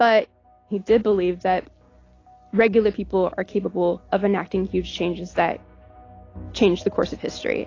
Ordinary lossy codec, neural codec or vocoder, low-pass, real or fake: AAC, 32 kbps; none; 7.2 kHz; real